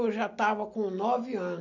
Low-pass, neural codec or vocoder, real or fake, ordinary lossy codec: 7.2 kHz; none; real; none